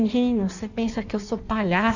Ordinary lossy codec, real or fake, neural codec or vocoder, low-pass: none; fake; codec, 16 kHz in and 24 kHz out, 1.1 kbps, FireRedTTS-2 codec; 7.2 kHz